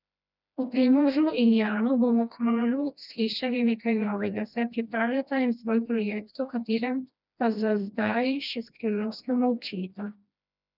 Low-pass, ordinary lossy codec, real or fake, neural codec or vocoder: 5.4 kHz; none; fake; codec, 16 kHz, 1 kbps, FreqCodec, smaller model